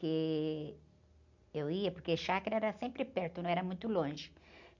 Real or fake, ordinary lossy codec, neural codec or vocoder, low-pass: real; none; none; 7.2 kHz